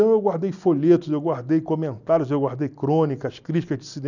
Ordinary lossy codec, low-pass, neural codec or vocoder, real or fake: none; 7.2 kHz; none; real